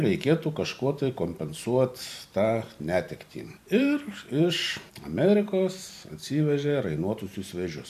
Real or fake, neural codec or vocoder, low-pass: fake; vocoder, 44.1 kHz, 128 mel bands every 512 samples, BigVGAN v2; 14.4 kHz